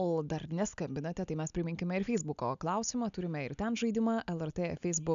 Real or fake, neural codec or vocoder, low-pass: real; none; 7.2 kHz